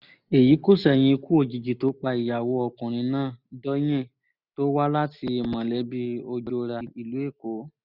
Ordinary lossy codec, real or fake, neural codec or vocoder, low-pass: none; real; none; 5.4 kHz